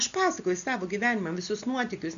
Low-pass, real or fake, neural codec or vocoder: 7.2 kHz; real; none